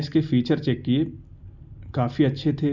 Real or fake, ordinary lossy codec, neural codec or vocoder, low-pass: real; none; none; 7.2 kHz